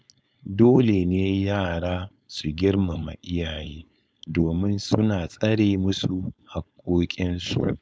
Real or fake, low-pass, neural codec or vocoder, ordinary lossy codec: fake; none; codec, 16 kHz, 4.8 kbps, FACodec; none